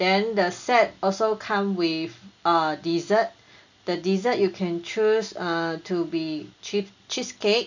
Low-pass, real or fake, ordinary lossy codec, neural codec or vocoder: 7.2 kHz; real; none; none